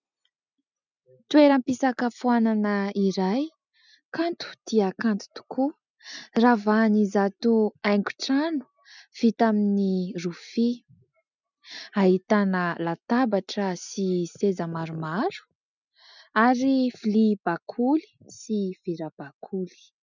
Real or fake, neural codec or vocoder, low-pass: real; none; 7.2 kHz